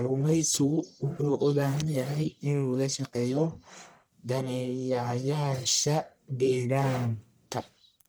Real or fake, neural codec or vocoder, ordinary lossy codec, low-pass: fake; codec, 44.1 kHz, 1.7 kbps, Pupu-Codec; none; none